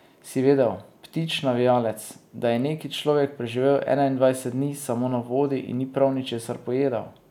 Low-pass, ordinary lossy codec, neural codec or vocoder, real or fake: 19.8 kHz; none; none; real